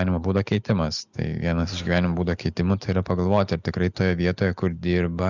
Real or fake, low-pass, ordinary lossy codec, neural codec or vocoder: real; 7.2 kHz; Opus, 64 kbps; none